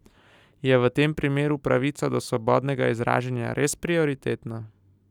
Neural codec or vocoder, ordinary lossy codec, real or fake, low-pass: none; none; real; 19.8 kHz